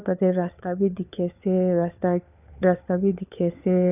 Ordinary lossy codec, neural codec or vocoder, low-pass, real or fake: none; codec, 16 kHz, 4 kbps, FunCodec, trained on LibriTTS, 50 frames a second; 3.6 kHz; fake